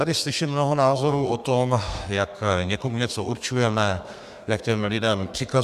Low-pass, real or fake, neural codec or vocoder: 14.4 kHz; fake; codec, 32 kHz, 1.9 kbps, SNAC